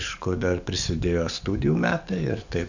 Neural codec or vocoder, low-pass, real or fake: codec, 44.1 kHz, 7.8 kbps, DAC; 7.2 kHz; fake